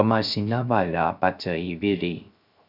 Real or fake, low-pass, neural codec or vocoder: fake; 5.4 kHz; codec, 16 kHz, 0.3 kbps, FocalCodec